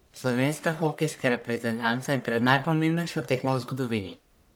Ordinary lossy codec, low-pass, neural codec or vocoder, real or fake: none; none; codec, 44.1 kHz, 1.7 kbps, Pupu-Codec; fake